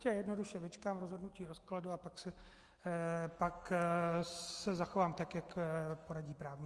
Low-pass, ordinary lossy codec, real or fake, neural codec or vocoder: 10.8 kHz; Opus, 24 kbps; fake; autoencoder, 48 kHz, 128 numbers a frame, DAC-VAE, trained on Japanese speech